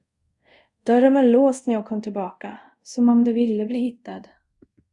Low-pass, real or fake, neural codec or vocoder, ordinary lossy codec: 10.8 kHz; fake; codec, 24 kHz, 0.5 kbps, DualCodec; Opus, 64 kbps